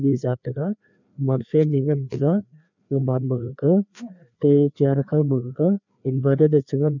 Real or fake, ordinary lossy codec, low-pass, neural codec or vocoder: fake; none; 7.2 kHz; codec, 16 kHz, 2 kbps, FreqCodec, larger model